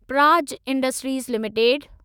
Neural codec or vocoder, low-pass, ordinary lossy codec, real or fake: autoencoder, 48 kHz, 128 numbers a frame, DAC-VAE, trained on Japanese speech; none; none; fake